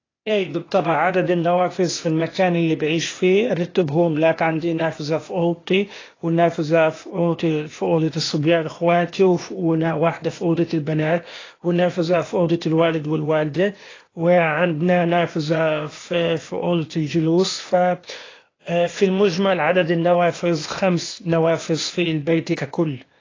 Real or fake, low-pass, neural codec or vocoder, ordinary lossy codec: fake; 7.2 kHz; codec, 16 kHz, 0.8 kbps, ZipCodec; AAC, 32 kbps